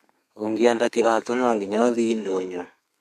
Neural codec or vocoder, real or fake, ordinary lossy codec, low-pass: codec, 32 kHz, 1.9 kbps, SNAC; fake; none; 14.4 kHz